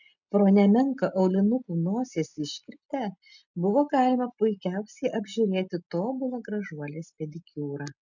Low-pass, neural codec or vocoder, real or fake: 7.2 kHz; none; real